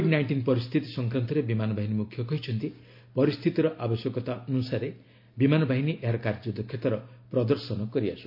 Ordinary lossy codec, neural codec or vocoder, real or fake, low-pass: MP3, 32 kbps; none; real; 5.4 kHz